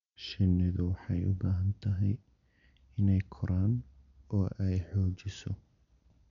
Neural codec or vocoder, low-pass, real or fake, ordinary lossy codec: none; 7.2 kHz; real; none